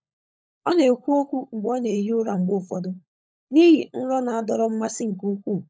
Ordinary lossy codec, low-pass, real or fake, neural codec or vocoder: none; none; fake; codec, 16 kHz, 16 kbps, FunCodec, trained on LibriTTS, 50 frames a second